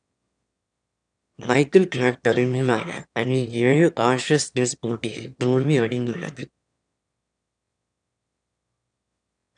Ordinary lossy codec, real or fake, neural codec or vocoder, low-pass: none; fake; autoencoder, 22.05 kHz, a latent of 192 numbers a frame, VITS, trained on one speaker; 9.9 kHz